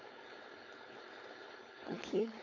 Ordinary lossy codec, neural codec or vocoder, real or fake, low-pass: AAC, 48 kbps; codec, 16 kHz, 4.8 kbps, FACodec; fake; 7.2 kHz